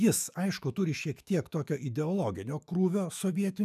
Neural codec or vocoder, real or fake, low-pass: autoencoder, 48 kHz, 128 numbers a frame, DAC-VAE, trained on Japanese speech; fake; 14.4 kHz